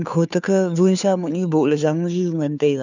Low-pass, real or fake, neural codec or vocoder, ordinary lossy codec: 7.2 kHz; fake; codec, 16 kHz, 2 kbps, FunCodec, trained on Chinese and English, 25 frames a second; none